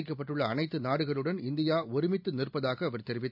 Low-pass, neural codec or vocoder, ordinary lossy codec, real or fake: 5.4 kHz; none; none; real